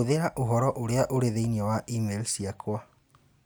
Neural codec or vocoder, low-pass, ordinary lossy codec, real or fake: none; none; none; real